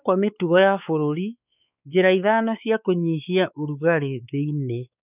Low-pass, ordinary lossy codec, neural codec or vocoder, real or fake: 3.6 kHz; none; codec, 16 kHz, 4 kbps, X-Codec, WavLM features, trained on Multilingual LibriSpeech; fake